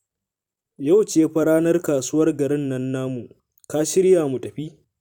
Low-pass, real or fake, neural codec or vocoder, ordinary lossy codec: 19.8 kHz; fake; vocoder, 44.1 kHz, 128 mel bands every 256 samples, BigVGAN v2; none